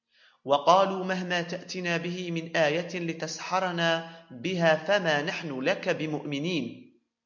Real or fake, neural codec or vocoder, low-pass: real; none; 7.2 kHz